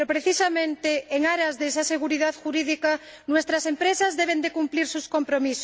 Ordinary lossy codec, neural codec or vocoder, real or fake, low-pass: none; none; real; none